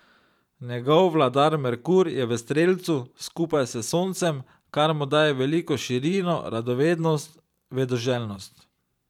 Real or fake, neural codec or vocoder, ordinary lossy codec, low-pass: fake; vocoder, 44.1 kHz, 128 mel bands every 512 samples, BigVGAN v2; none; 19.8 kHz